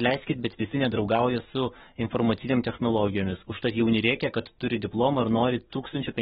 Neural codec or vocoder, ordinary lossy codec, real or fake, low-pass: codec, 44.1 kHz, 7.8 kbps, DAC; AAC, 16 kbps; fake; 19.8 kHz